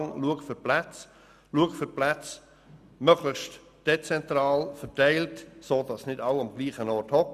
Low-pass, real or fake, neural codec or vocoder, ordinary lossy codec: 14.4 kHz; real; none; none